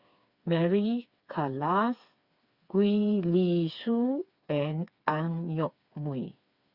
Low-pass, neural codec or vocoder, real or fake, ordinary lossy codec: 5.4 kHz; codec, 16 kHz, 4 kbps, FreqCodec, smaller model; fake; Opus, 64 kbps